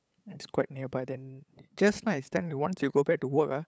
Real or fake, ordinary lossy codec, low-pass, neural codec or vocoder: fake; none; none; codec, 16 kHz, 8 kbps, FunCodec, trained on LibriTTS, 25 frames a second